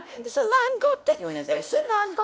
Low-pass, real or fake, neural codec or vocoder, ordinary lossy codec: none; fake; codec, 16 kHz, 1 kbps, X-Codec, WavLM features, trained on Multilingual LibriSpeech; none